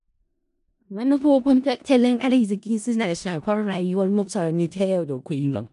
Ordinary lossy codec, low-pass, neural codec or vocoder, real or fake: none; 10.8 kHz; codec, 16 kHz in and 24 kHz out, 0.4 kbps, LongCat-Audio-Codec, four codebook decoder; fake